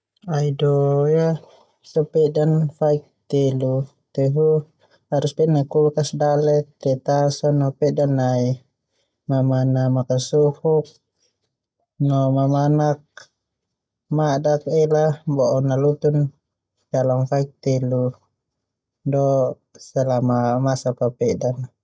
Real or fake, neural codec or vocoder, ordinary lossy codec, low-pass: real; none; none; none